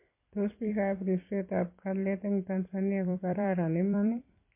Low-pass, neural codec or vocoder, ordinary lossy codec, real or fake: 3.6 kHz; vocoder, 44.1 kHz, 128 mel bands every 256 samples, BigVGAN v2; MP3, 24 kbps; fake